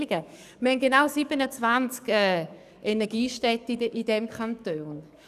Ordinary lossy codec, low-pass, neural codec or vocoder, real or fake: none; 14.4 kHz; codec, 44.1 kHz, 7.8 kbps, DAC; fake